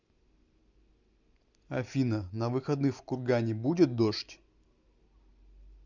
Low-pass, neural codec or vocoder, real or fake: 7.2 kHz; none; real